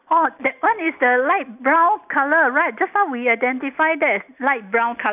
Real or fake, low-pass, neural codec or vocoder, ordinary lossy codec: real; 3.6 kHz; none; none